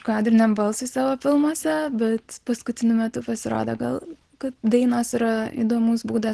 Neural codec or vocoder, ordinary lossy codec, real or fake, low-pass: none; Opus, 16 kbps; real; 10.8 kHz